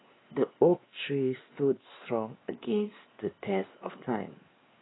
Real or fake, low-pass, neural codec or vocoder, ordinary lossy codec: fake; 7.2 kHz; codec, 16 kHz, 2 kbps, X-Codec, WavLM features, trained on Multilingual LibriSpeech; AAC, 16 kbps